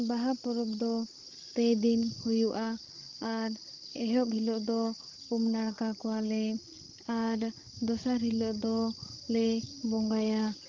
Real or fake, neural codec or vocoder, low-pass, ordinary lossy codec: fake; codec, 16 kHz, 16 kbps, FreqCodec, larger model; 7.2 kHz; Opus, 16 kbps